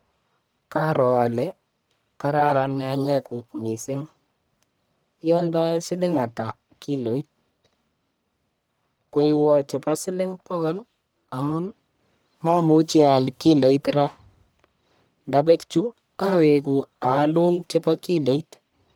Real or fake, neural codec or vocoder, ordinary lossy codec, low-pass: fake; codec, 44.1 kHz, 1.7 kbps, Pupu-Codec; none; none